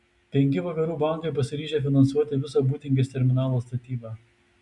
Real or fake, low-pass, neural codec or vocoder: real; 10.8 kHz; none